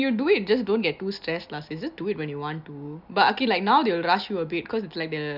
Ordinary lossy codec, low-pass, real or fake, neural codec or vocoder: none; 5.4 kHz; real; none